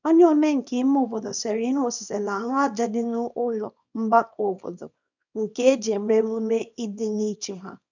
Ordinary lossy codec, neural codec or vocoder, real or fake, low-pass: none; codec, 24 kHz, 0.9 kbps, WavTokenizer, small release; fake; 7.2 kHz